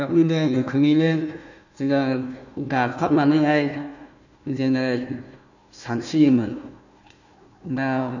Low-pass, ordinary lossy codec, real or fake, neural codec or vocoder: 7.2 kHz; none; fake; codec, 16 kHz, 1 kbps, FunCodec, trained on Chinese and English, 50 frames a second